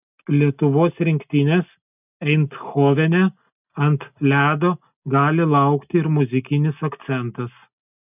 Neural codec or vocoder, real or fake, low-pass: none; real; 3.6 kHz